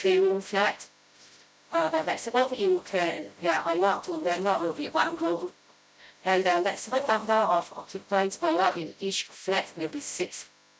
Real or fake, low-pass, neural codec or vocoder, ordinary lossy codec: fake; none; codec, 16 kHz, 0.5 kbps, FreqCodec, smaller model; none